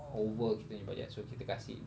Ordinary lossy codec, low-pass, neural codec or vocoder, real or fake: none; none; none; real